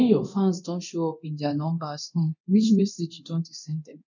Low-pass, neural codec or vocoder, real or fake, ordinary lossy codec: 7.2 kHz; codec, 24 kHz, 0.9 kbps, DualCodec; fake; none